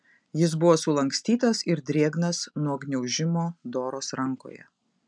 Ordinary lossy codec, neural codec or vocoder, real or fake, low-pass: MP3, 96 kbps; none; real; 9.9 kHz